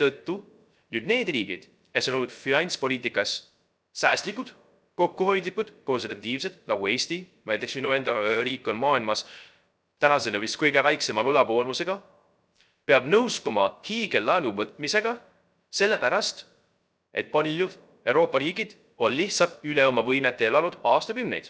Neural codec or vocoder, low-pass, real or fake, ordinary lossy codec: codec, 16 kHz, 0.3 kbps, FocalCodec; none; fake; none